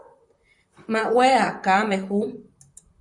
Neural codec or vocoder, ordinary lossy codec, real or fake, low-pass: vocoder, 44.1 kHz, 128 mel bands, Pupu-Vocoder; Opus, 64 kbps; fake; 10.8 kHz